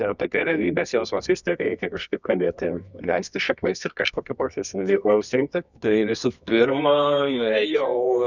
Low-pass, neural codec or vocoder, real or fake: 7.2 kHz; codec, 24 kHz, 0.9 kbps, WavTokenizer, medium music audio release; fake